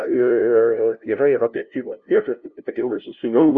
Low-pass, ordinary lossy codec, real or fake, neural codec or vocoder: 7.2 kHz; Opus, 64 kbps; fake; codec, 16 kHz, 0.5 kbps, FunCodec, trained on LibriTTS, 25 frames a second